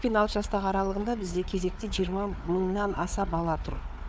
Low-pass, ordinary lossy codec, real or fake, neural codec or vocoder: none; none; fake; codec, 16 kHz, 4 kbps, FunCodec, trained on Chinese and English, 50 frames a second